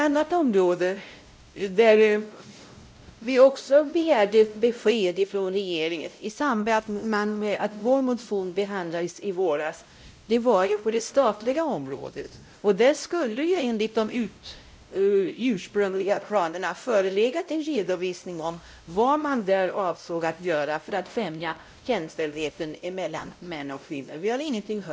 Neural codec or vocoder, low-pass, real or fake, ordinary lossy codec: codec, 16 kHz, 0.5 kbps, X-Codec, WavLM features, trained on Multilingual LibriSpeech; none; fake; none